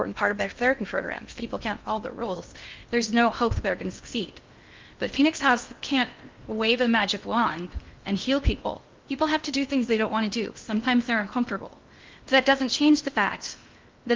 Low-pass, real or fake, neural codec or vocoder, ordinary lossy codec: 7.2 kHz; fake; codec, 16 kHz in and 24 kHz out, 0.6 kbps, FocalCodec, streaming, 2048 codes; Opus, 32 kbps